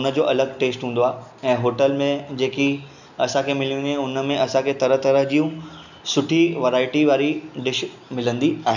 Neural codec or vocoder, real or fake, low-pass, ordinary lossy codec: none; real; 7.2 kHz; none